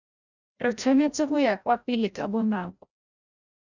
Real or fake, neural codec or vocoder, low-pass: fake; codec, 16 kHz, 0.5 kbps, FreqCodec, larger model; 7.2 kHz